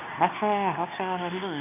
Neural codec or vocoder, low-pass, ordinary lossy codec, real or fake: codec, 24 kHz, 0.9 kbps, WavTokenizer, medium speech release version 2; 3.6 kHz; none; fake